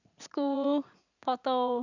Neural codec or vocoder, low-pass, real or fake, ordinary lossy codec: vocoder, 44.1 kHz, 80 mel bands, Vocos; 7.2 kHz; fake; none